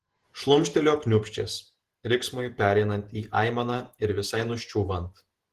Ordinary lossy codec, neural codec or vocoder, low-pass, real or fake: Opus, 16 kbps; vocoder, 48 kHz, 128 mel bands, Vocos; 14.4 kHz; fake